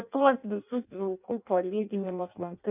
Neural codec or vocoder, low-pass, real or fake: codec, 16 kHz in and 24 kHz out, 0.6 kbps, FireRedTTS-2 codec; 3.6 kHz; fake